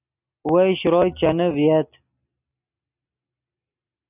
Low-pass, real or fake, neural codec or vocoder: 3.6 kHz; real; none